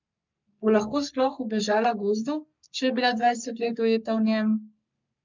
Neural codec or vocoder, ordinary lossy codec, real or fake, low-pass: codec, 44.1 kHz, 3.4 kbps, Pupu-Codec; MP3, 64 kbps; fake; 7.2 kHz